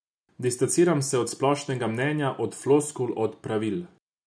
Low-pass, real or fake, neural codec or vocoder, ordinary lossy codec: none; real; none; none